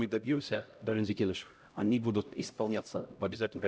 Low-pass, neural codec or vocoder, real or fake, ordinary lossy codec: none; codec, 16 kHz, 0.5 kbps, X-Codec, HuBERT features, trained on LibriSpeech; fake; none